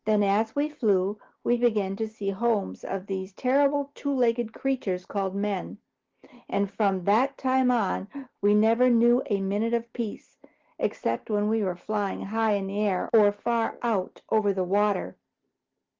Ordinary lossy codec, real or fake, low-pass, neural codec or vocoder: Opus, 16 kbps; real; 7.2 kHz; none